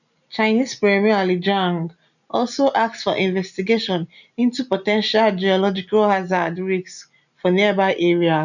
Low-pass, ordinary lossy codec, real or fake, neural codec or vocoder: 7.2 kHz; none; real; none